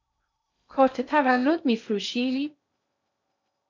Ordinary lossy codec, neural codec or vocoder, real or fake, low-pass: MP3, 48 kbps; codec, 16 kHz in and 24 kHz out, 0.6 kbps, FocalCodec, streaming, 2048 codes; fake; 7.2 kHz